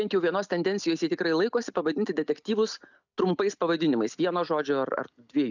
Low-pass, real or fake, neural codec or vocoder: 7.2 kHz; real; none